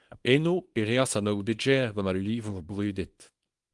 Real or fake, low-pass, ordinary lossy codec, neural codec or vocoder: fake; 10.8 kHz; Opus, 24 kbps; codec, 24 kHz, 0.9 kbps, WavTokenizer, small release